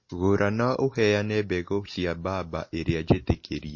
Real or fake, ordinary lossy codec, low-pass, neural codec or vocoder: real; MP3, 32 kbps; 7.2 kHz; none